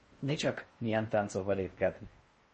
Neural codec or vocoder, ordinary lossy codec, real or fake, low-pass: codec, 16 kHz in and 24 kHz out, 0.6 kbps, FocalCodec, streaming, 4096 codes; MP3, 32 kbps; fake; 10.8 kHz